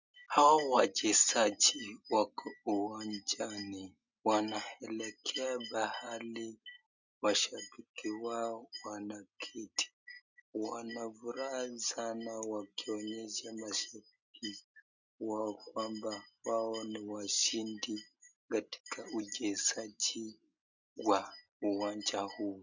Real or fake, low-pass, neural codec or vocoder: real; 7.2 kHz; none